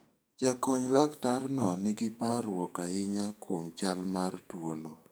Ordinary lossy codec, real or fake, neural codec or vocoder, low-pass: none; fake; codec, 44.1 kHz, 2.6 kbps, SNAC; none